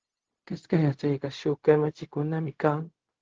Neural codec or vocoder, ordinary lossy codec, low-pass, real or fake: codec, 16 kHz, 0.4 kbps, LongCat-Audio-Codec; Opus, 16 kbps; 7.2 kHz; fake